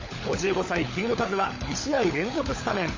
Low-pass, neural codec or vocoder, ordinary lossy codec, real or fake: 7.2 kHz; codec, 16 kHz, 16 kbps, FunCodec, trained on LibriTTS, 50 frames a second; MP3, 32 kbps; fake